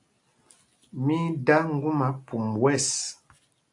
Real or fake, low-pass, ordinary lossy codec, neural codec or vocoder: real; 10.8 kHz; AAC, 64 kbps; none